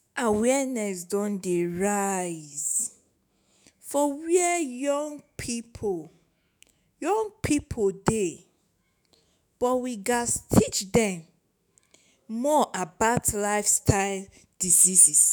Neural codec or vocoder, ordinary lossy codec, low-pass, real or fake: autoencoder, 48 kHz, 128 numbers a frame, DAC-VAE, trained on Japanese speech; none; none; fake